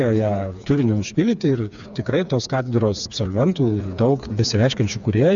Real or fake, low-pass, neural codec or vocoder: fake; 7.2 kHz; codec, 16 kHz, 4 kbps, FreqCodec, smaller model